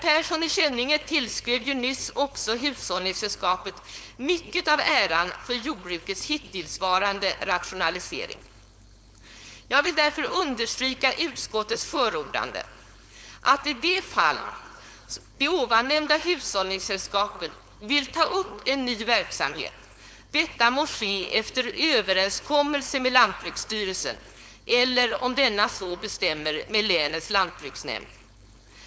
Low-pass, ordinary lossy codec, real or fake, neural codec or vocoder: none; none; fake; codec, 16 kHz, 4.8 kbps, FACodec